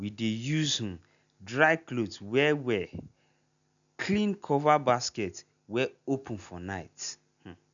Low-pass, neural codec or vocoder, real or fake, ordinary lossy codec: 7.2 kHz; none; real; none